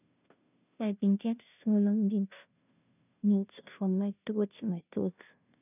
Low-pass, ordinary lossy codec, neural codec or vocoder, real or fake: 3.6 kHz; none; codec, 16 kHz, 0.5 kbps, FunCodec, trained on Chinese and English, 25 frames a second; fake